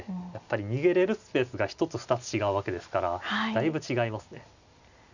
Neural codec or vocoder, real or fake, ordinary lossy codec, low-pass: none; real; none; 7.2 kHz